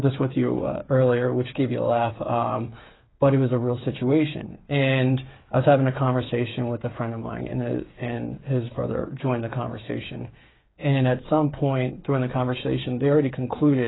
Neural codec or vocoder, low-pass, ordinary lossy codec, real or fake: codec, 16 kHz, 8 kbps, FreqCodec, smaller model; 7.2 kHz; AAC, 16 kbps; fake